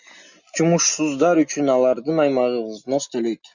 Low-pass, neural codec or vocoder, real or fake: 7.2 kHz; none; real